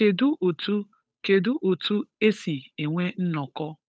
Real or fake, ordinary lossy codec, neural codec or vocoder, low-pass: fake; none; codec, 16 kHz, 8 kbps, FunCodec, trained on Chinese and English, 25 frames a second; none